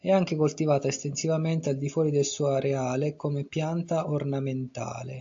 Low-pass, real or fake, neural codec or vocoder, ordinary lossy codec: 7.2 kHz; real; none; AAC, 48 kbps